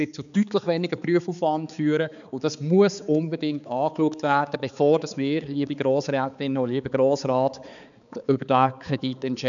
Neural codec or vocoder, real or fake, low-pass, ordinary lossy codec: codec, 16 kHz, 4 kbps, X-Codec, HuBERT features, trained on balanced general audio; fake; 7.2 kHz; none